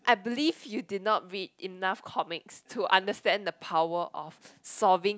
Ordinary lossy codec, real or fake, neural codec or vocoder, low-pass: none; real; none; none